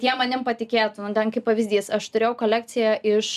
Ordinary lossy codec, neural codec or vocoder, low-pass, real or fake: MP3, 96 kbps; none; 14.4 kHz; real